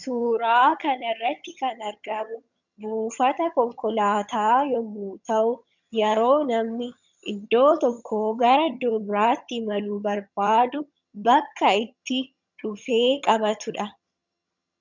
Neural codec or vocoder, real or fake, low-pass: vocoder, 22.05 kHz, 80 mel bands, HiFi-GAN; fake; 7.2 kHz